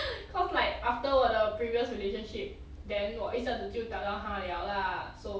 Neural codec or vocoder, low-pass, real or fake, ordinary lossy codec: none; none; real; none